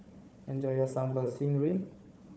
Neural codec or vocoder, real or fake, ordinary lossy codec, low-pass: codec, 16 kHz, 4 kbps, FunCodec, trained on Chinese and English, 50 frames a second; fake; none; none